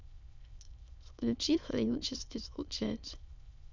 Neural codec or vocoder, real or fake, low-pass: autoencoder, 22.05 kHz, a latent of 192 numbers a frame, VITS, trained on many speakers; fake; 7.2 kHz